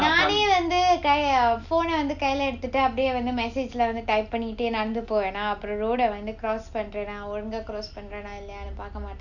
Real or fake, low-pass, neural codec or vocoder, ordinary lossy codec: real; 7.2 kHz; none; none